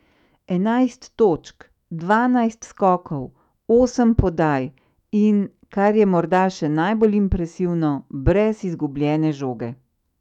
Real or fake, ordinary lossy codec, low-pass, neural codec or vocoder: fake; none; 19.8 kHz; autoencoder, 48 kHz, 128 numbers a frame, DAC-VAE, trained on Japanese speech